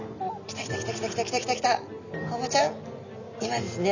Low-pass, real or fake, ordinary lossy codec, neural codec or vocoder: 7.2 kHz; real; none; none